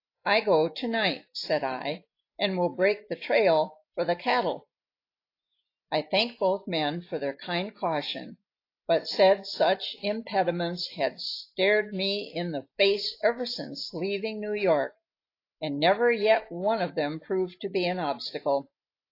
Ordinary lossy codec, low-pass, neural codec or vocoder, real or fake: AAC, 32 kbps; 5.4 kHz; none; real